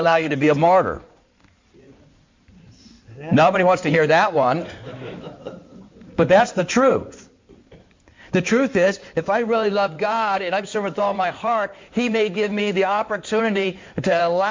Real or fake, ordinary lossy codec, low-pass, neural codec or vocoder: fake; MP3, 48 kbps; 7.2 kHz; codec, 16 kHz in and 24 kHz out, 2.2 kbps, FireRedTTS-2 codec